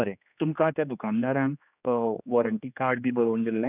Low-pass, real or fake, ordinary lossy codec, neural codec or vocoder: 3.6 kHz; fake; none; codec, 16 kHz, 2 kbps, X-Codec, HuBERT features, trained on general audio